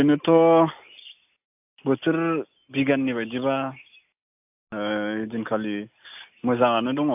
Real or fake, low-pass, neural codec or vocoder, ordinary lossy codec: real; 3.6 kHz; none; AAC, 32 kbps